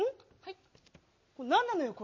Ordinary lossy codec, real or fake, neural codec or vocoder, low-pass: MP3, 32 kbps; real; none; 7.2 kHz